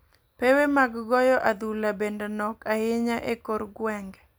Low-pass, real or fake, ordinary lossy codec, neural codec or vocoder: none; real; none; none